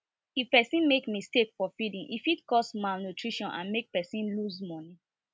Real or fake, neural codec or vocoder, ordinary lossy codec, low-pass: real; none; none; none